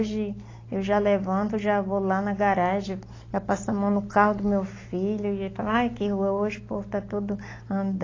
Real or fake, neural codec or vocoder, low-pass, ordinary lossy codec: real; none; 7.2 kHz; AAC, 32 kbps